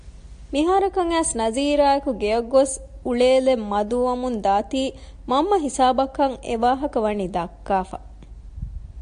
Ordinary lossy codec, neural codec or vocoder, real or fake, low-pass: AAC, 64 kbps; none; real; 9.9 kHz